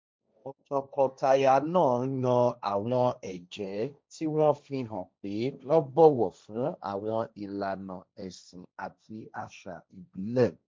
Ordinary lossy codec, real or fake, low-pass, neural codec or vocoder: none; fake; none; codec, 16 kHz, 1.1 kbps, Voila-Tokenizer